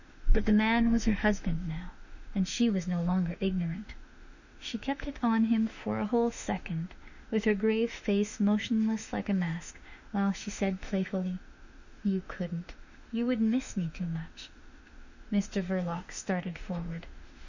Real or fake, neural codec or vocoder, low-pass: fake; autoencoder, 48 kHz, 32 numbers a frame, DAC-VAE, trained on Japanese speech; 7.2 kHz